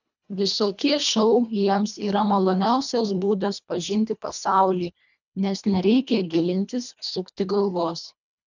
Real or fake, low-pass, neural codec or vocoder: fake; 7.2 kHz; codec, 24 kHz, 1.5 kbps, HILCodec